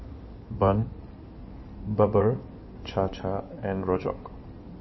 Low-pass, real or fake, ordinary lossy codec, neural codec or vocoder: 7.2 kHz; fake; MP3, 24 kbps; codec, 16 kHz in and 24 kHz out, 2.2 kbps, FireRedTTS-2 codec